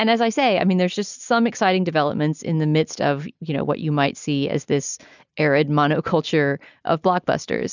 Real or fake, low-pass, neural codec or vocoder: real; 7.2 kHz; none